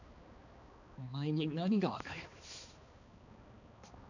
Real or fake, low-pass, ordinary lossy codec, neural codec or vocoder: fake; 7.2 kHz; none; codec, 16 kHz, 2 kbps, X-Codec, HuBERT features, trained on balanced general audio